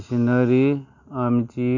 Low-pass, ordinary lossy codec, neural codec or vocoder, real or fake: 7.2 kHz; AAC, 48 kbps; none; real